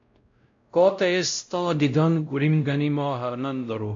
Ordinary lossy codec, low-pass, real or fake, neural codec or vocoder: AAC, 48 kbps; 7.2 kHz; fake; codec, 16 kHz, 0.5 kbps, X-Codec, WavLM features, trained on Multilingual LibriSpeech